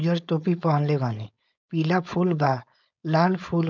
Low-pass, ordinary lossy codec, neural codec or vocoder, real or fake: 7.2 kHz; none; codec, 16 kHz, 4.8 kbps, FACodec; fake